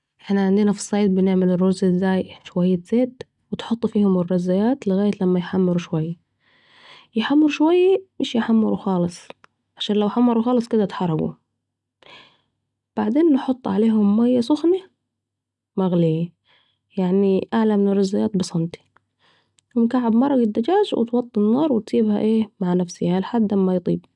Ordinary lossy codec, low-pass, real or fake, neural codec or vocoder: none; 10.8 kHz; real; none